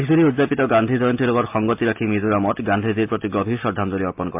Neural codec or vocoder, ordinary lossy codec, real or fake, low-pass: none; MP3, 32 kbps; real; 3.6 kHz